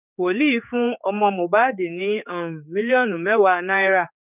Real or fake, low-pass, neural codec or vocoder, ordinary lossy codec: fake; 3.6 kHz; codec, 16 kHz, 4 kbps, X-Codec, HuBERT features, trained on general audio; none